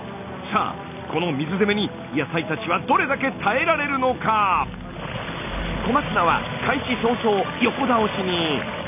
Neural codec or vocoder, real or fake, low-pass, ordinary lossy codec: none; real; 3.6 kHz; none